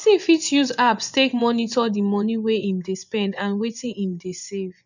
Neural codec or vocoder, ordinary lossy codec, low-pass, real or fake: vocoder, 24 kHz, 100 mel bands, Vocos; none; 7.2 kHz; fake